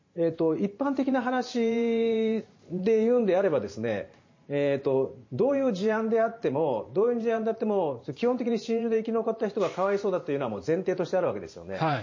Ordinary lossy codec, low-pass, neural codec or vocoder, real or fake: MP3, 32 kbps; 7.2 kHz; vocoder, 44.1 kHz, 128 mel bands every 512 samples, BigVGAN v2; fake